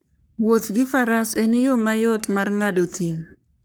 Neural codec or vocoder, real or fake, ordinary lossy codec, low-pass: codec, 44.1 kHz, 3.4 kbps, Pupu-Codec; fake; none; none